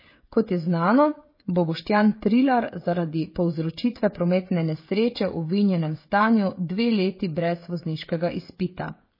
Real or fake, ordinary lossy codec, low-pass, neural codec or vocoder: fake; MP3, 24 kbps; 5.4 kHz; codec, 16 kHz, 16 kbps, FreqCodec, smaller model